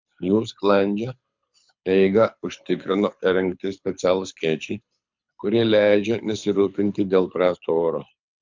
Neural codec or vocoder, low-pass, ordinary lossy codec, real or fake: codec, 24 kHz, 6 kbps, HILCodec; 7.2 kHz; MP3, 64 kbps; fake